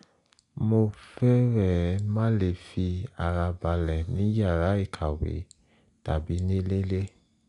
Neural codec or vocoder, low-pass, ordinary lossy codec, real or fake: none; 10.8 kHz; none; real